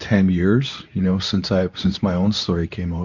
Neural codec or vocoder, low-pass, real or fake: codec, 24 kHz, 0.9 kbps, WavTokenizer, medium speech release version 2; 7.2 kHz; fake